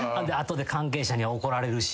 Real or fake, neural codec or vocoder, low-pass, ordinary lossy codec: real; none; none; none